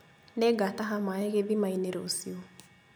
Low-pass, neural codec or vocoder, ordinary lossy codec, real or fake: none; none; none; real